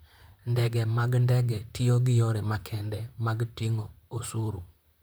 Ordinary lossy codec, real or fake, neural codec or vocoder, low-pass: none; fake; vocoder, 44.1 kHz, 128 mel bands, Pupu-Vocoder; none